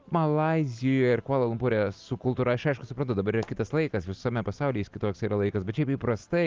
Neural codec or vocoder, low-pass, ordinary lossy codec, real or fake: none; 7.2 kHz; Opus, 24 kbps; real